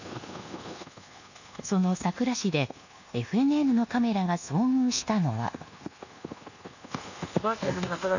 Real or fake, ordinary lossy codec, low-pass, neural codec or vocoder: fake; none; 7.2 kHz; codec, 24 kHz, 1.2 kbps, DualCodec